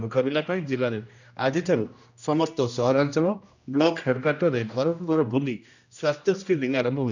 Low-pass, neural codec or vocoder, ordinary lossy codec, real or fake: 7.2 kHz; codec, 16 kHz, 1 kbps, X-Codec, HuBERT features, trained on general audio; none; fake